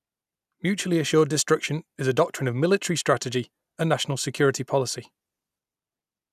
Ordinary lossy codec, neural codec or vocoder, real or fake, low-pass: none; none; real; 14.4 kHz